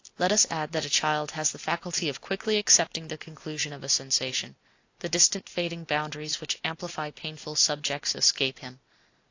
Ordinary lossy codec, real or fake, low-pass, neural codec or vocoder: AAC, 48 kbps; real; 7.2 kHz; none